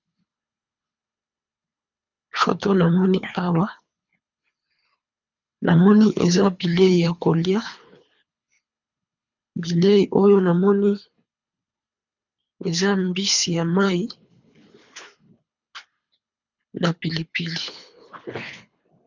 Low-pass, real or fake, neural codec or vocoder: 7.2 kHz; fake; codec, 24 kHz, 3 kbps, HILCodec